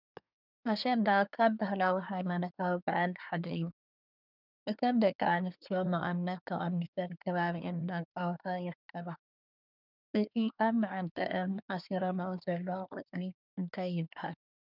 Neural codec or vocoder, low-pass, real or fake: codec, 24 kHz, 1 kbps, SNAC; 5.4 kHz; fake